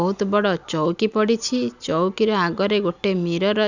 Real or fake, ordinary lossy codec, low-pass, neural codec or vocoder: real; none; 7.2 kHz; none